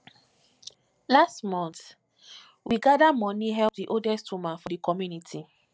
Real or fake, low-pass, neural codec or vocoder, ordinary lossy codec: real; none; none; none